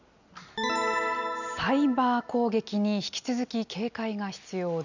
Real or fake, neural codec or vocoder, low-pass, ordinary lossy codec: real; none; 7.2 kHz; none